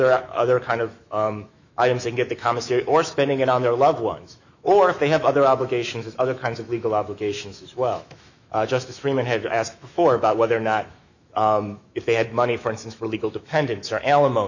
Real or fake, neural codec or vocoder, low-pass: fake; autoencoder, 48 kHz, 128 numbers a frame, DAC-VAE, trained on Japanese speech; 7.2 kHz